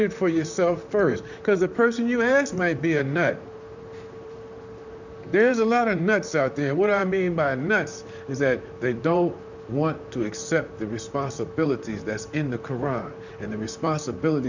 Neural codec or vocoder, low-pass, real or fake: vocoder, 44.1 kHz, 128 mel bands, Pupu-Vocoder; 7.2 kHz; fake